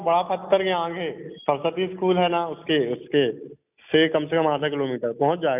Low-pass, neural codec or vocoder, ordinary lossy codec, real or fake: 3.6 kHz; none; none; real